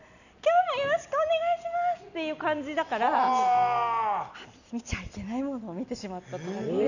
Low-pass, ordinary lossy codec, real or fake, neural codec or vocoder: 7.2 kHz; none; real; none